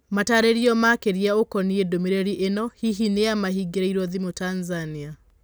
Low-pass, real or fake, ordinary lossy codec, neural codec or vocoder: none; real; none; none